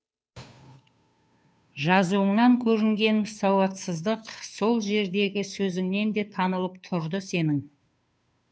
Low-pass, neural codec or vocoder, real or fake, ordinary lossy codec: none; codec, 16 kHz, 2 kbps, FunCodec, trained on Chinese and English, 25 frames a second; fake; none